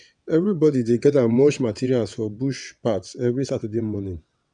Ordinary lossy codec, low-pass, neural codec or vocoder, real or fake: none; 9.9 kHz; vocoder, 22.05 kHz, 80 mel bands, WaveNeXt; fake